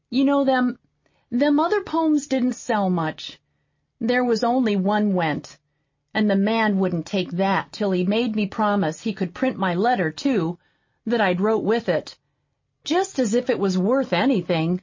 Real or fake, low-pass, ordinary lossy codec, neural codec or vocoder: real; 7.2 kHz; MP3, 32 kbps; none